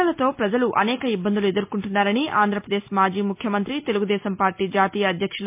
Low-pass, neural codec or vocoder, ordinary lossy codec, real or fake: 3.6 kHz; none; MP3, 32 kbps; real